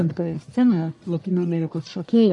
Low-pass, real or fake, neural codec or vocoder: 10.8 kHz; fake; codec, 44.1 kHz, 1.7 kbps, Pupu-Codec